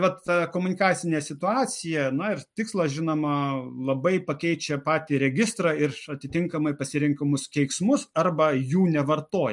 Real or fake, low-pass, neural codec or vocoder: real; 10.8 kHz; none